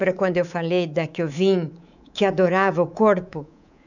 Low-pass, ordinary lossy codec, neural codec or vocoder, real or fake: 7.2 kHz; none; codec, 24 kHz, 3.1 kbps, DualCodec; fake